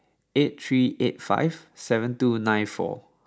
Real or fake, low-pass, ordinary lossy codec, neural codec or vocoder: real; none; none; none